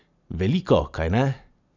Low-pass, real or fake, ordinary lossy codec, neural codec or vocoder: 7.2 kHz; real; none; none